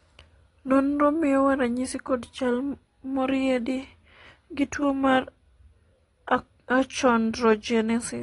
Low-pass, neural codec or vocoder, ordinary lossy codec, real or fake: 10.8 kHz; none; AAC, 32 kbps; real